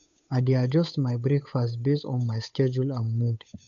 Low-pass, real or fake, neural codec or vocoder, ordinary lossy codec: 7.2 kHz; fake; codec, 16 kHz, 8 kbps, FunCodec, trained on Chinese and English, 25 frames a second; none